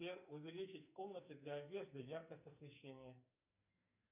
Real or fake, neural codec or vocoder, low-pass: fake; codec, 44.1 kHz, 2.6 kbps, SNAC; 3.6 kHz